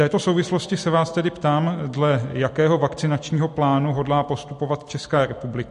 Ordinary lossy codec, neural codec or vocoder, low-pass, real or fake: MP3, 48 kbps; none; 14.4 kHz; real